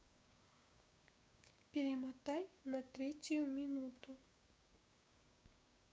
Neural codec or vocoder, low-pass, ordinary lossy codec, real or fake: codec, 16 kHz, 6 kbps, DAC; none; none; fake